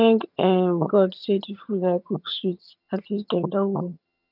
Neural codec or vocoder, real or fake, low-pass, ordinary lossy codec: vocoder, 22.05 kHz, 80 mel bands, HiFi-GAN; fake; 5.4 kHz; none